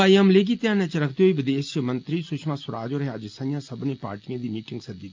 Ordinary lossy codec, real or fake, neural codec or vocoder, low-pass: Opus, 24 kbps; real; none; 7.2 kHz